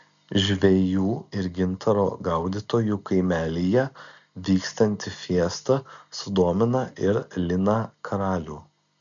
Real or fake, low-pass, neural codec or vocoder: real; 7.2 kHz; none